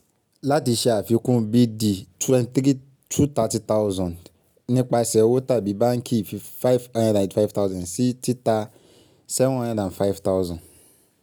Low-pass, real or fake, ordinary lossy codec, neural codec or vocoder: none; real; none; none